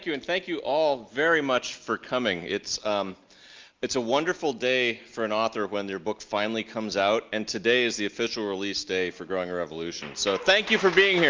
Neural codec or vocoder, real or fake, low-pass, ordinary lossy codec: none; real; 7.2 kHz; Opus, 24 kbps